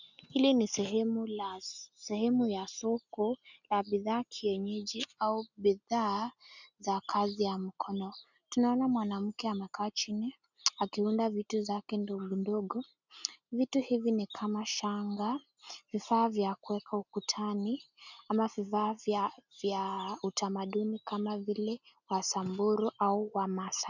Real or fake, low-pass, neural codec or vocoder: real; 7.2 kHz; none